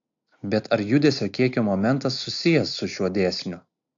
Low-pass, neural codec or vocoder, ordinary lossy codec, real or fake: 7.2 kHz; none; AAC, 64 kbps; real